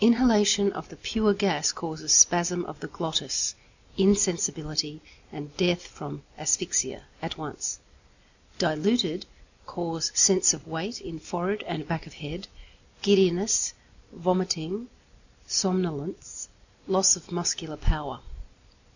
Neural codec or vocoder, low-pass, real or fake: none; 7.2 kHz; real